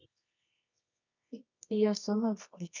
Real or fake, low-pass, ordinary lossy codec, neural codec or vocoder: fake; 7.2 kHz; none; codec, 24 kHz, 0.9 kbps, WavTokenizer, medium music audio release